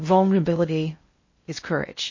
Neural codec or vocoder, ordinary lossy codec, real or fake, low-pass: codec, 16 kHz in and 24 kHz out, 0.6 kbps, FocalCodec, streaming, 4096 codes; MP3, 32 kbps; fake; 7.2 kHz